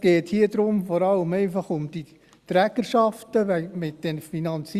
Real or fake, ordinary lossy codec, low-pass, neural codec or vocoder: real; Opus, 64 kbps; 14.4 kHz; none